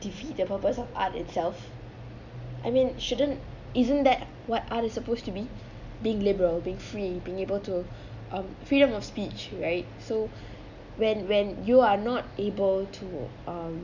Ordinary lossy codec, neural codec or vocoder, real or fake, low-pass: none; none; real; 7.2 kHz